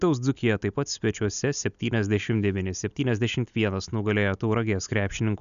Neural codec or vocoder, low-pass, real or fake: none; 7.2 kHz; real